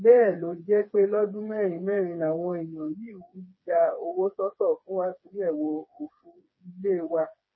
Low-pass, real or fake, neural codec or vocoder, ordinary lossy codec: 7.2 kHz; fake; codec, 16 kHz, 4 kbps, FreqCodec, smaller model; MP3, 24 kbps